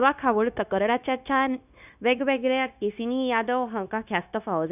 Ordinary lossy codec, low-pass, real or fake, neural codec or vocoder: none; 3.6 kHz; fake; codec, 16 kHz, 0.9 kbps, LongCat-Audio-Codec